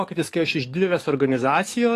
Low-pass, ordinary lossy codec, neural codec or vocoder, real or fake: 14.4 kHz; AAC, 48 kbps; codec, 44.1 kHz, 7.8 kbps, DAC; fake